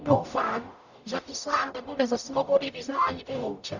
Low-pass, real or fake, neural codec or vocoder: 7.2 kHz; fake; codec, 44.1 kHz, 0.9 kbps, DAC